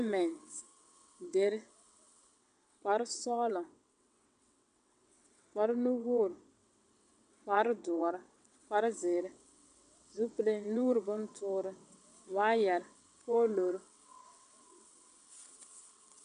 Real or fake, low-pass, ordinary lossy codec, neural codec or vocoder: fake; 9.9 kHz; AAC, 64 kbps; vocoder, 22.05 kHz, 80 mel bands, WaveNeXt